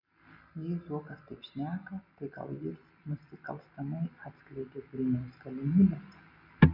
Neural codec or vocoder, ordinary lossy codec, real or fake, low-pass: none; MP3, 48 kbps; real; 5.4 kHz